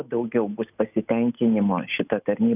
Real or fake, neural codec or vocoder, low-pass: real; none; 3.6 kHz